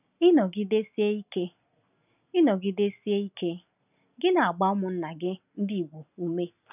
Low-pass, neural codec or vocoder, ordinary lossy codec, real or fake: 3.6 kHz; none; none; real